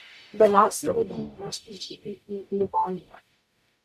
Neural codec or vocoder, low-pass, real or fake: codec, 44.1 kHz, 0.9 kbps, DAC; 14.4 kHz; fake